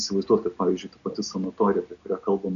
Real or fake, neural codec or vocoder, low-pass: real; none; 7.2 kHz